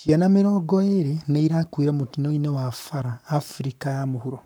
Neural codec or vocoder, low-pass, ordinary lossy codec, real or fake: codec, 44.1 kHz, 7.8 kbps, Pupu-Codec; none; none; fake